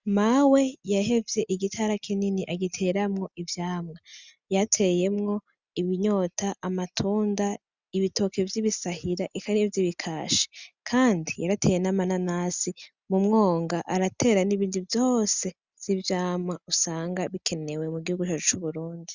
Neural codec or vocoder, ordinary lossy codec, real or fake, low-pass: none; Opus, 64 kbps; real; 7.2 kHz